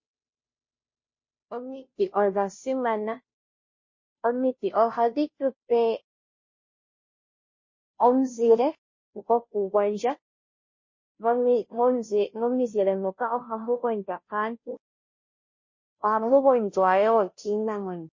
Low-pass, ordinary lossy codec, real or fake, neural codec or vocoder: 7.2 kHz; MP3, 32 kbps; fake; codec, 16 kHz, 0.5 kbps, FunCodec, trained on Chinese and English, 25 frames a second